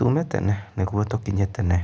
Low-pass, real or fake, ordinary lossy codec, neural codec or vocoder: none; real; none; none